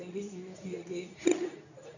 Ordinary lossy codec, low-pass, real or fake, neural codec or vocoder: none; 7.2 kHz; fake; codec, 24 kHz, 0.9 kbps, WavTokenizer, medium speech release version 2